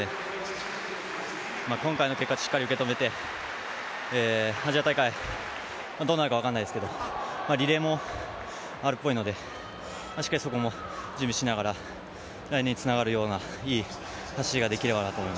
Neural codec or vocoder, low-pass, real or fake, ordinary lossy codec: none; none; real; none